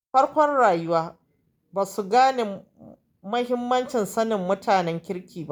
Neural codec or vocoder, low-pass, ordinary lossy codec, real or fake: none; none; none; real